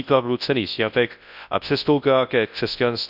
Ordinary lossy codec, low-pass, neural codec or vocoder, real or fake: none; 5.4 kHz; codec, 24 kHz, 0.9 kbps, WavTokenizer, large speech release; fake